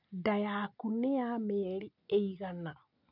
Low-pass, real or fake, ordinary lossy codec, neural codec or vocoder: 5.4 kHz; real; none; none